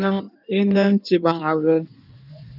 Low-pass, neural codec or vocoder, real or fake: 5.4 kHz; codec, 16 kHz in and 24 kHz out, 1.1 kbps, FireRedTTS-2 codec; fake